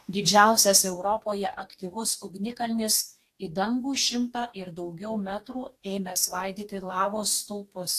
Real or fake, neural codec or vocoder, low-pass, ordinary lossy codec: fake; codec, 44.1 kHz, 2.6 kbps, DAC; 14.4 kHz; AAC, 64 kbps